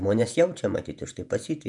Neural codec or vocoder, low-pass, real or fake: vocoder, 48 kHz, 128 mel bands, Vocos; 10.8 kHz; fake